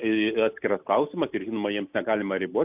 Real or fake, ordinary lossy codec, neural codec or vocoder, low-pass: real; AAC, 32 kbps; none; 3.6 kHz